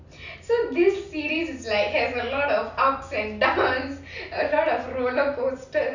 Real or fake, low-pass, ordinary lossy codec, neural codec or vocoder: real; 7.2 kHz; none; none